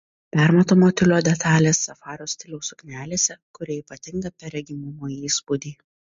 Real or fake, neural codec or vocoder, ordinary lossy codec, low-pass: real; none; MP3, 48 kbps; 7.2 kHz